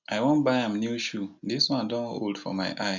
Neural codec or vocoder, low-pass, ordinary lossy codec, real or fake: none; 7.2 kHz; none; real